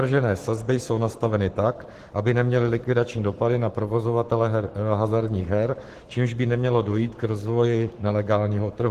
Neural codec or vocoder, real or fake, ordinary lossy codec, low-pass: codec, 44.1 kHz, 7.8 kbps, Pupu-Codec; fake; Opus, 16 kbps; 14.4 kHz